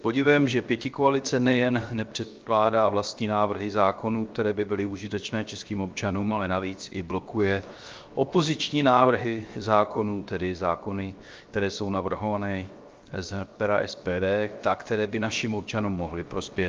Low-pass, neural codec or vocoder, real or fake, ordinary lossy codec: 7.2 kHz; codec, 16 kHz, 0.7 kbps, FocalCodec; fake; Opus, 24 kbps